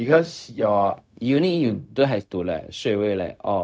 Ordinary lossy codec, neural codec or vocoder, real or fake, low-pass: none; codec, 16 kHz, 0.4 kbps, LongCat-Audio-Codec; fake; none